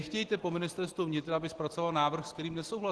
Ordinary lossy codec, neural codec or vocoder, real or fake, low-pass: Opus, 16 kbps; none; real; 10.8 kHz